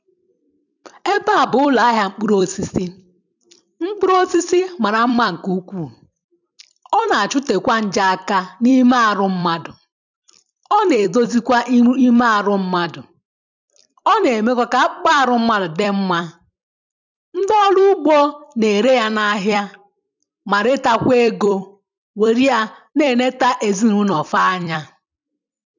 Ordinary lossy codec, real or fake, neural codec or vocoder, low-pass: none; fake; vocoder, 44.1 kHz, 128 mel bands every 256 samples, BigVGAN v2; 7.2 kHz